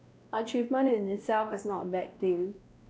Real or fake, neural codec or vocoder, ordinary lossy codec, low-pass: fake; codec, 16 kHz, 1 kbps, X-Codec, WavLM features, trained on Multilingual LibriSpeech; none; none